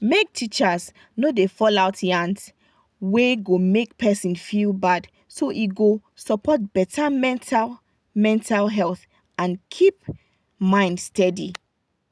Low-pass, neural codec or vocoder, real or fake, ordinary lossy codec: none; none; real; none